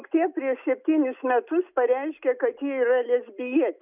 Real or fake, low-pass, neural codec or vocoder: real; 3.6 kHz; none